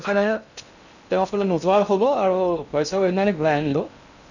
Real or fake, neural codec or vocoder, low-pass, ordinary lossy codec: fake; codec, 16 kHz in and 24 kHz out, 0.6 kbps, FocalCodec, streaming, 2048 codes; 7.2 kHz; none